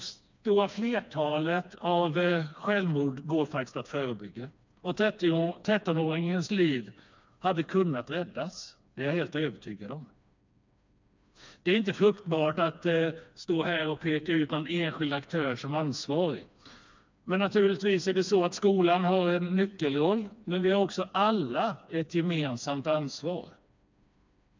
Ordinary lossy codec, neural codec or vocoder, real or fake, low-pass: MP3, 64 kbps; codec, 16 kHz, 2 kbps, FreqCodec, smaller model; fake; 7.2 kHz